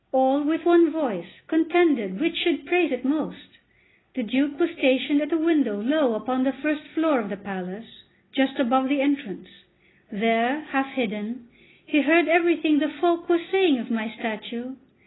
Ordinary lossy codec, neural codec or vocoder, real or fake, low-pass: AAC, 16 kbps; none; real; 7.2 kHz